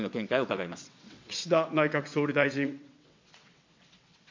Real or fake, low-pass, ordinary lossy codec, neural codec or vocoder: fake; 7.2 kHz; MP3, 48 kbps; vocoder, 22.05 kHz, 80 mel bands, WaveNeXt